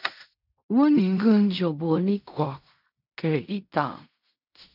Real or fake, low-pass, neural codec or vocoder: fake; 5.4 kHz; codec, 16 kHz in and 24 kHz out, 0.4 kbps, LongCat-Audio-Codec, fine tuned four codebook decoder